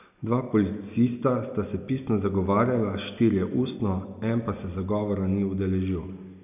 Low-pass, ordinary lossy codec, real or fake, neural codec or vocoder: 3.6 kHz; none; real; none